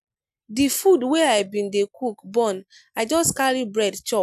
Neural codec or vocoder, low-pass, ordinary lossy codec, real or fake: none; 14.4 kHz; none; real